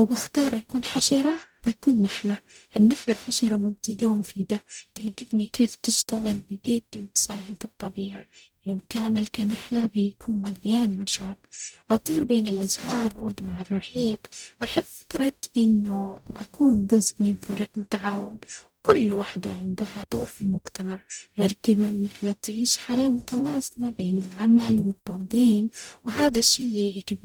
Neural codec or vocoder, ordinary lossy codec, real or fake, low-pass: codec, 44.1 kHz, 0.9 kbps, DAC; none; fake; none